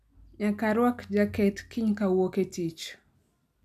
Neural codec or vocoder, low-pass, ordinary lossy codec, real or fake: none; 14.4 kHz; none; real